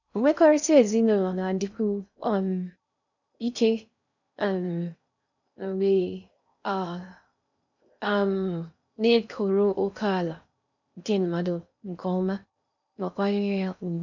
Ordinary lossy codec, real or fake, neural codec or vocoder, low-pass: none; fake; codec, 16 kHz in and 24 kHz out, 0.6 kbps, FocalCodec, streaming, 2048 codes; 7.2 kHz